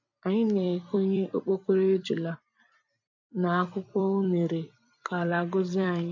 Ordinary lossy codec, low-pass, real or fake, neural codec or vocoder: AAC, 48 kbps; 7.2 kHz; fake; vocoder, 44.1 kHz, 128 mel bands every 256 samples, BigVGAN v2